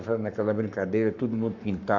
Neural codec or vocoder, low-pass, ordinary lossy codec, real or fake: codec, 44.1 kHz, 7.8 kbps, Pupu-Codec; 7.2 kHz; none; fake